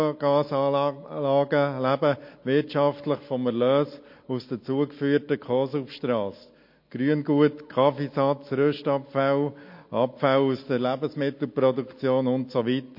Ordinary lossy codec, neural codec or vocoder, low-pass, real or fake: MP3, 32 kbps; none; 5.4 kHz; real